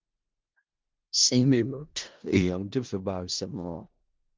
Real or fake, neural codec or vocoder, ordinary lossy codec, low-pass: fake; codec, 16 kHz in and 24 kHz out, 0.4 kbps, LongCat-Audio-Codec, four codebook decoder; Opus, 24 kbps; 7.2 kHz